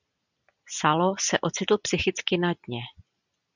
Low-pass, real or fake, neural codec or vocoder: 7.2 kHz; real; none